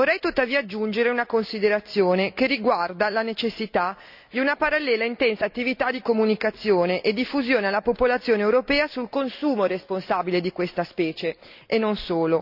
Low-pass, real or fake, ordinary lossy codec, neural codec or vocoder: 5.4 kHz; real; none; none